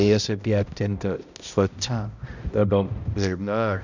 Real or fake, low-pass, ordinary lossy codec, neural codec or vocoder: fake; 7.2 kHz; none; codec, 16 kHz, 0.5 kbps, X-Codec, HuBERT features, trained on balanced general audio